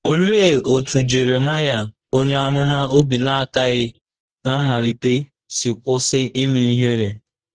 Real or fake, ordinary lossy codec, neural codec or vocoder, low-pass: fake; Opus, 16 kbps; codec, 24 kHz, 0.9 kbps, WavTokenizer, medium music audio release; 9.9 kHz